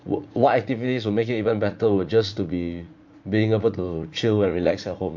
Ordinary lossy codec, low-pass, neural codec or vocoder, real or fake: MP3, 48 kbps; 7.2 kHz; vocoder, 44.1 kHz, 80 mel bands, Vocos; fake